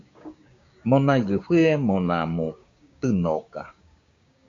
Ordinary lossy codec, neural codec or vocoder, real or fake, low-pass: AAC, 48 kbps; codec, 16 kHz, 6 kbps, DAC; fake; 7.2 kHz